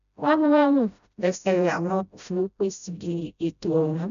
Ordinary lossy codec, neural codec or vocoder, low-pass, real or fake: none; codec, 16 kHz, 0.5 kbps, FreqCodec, smaller model; 7.2 kHz; fake